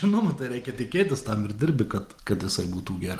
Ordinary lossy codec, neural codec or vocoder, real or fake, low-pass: Opus, 24 kbps; none; real; 14.4 kHz